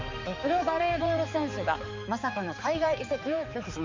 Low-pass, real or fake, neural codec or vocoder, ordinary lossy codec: 7.2 kHz; fake; codec, 16 kHz, 4 kbps, X-Codec, HuBERT features, trained on balanced general audio; MP3, 48 kbps